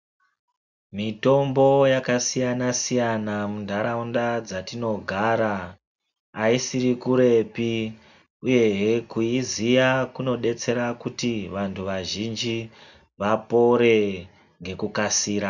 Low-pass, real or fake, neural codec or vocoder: 7.2 kHz; real; none